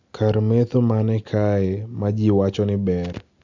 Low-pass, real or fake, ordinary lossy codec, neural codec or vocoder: 7.2 kHz; real; MP3, 64 kbps; none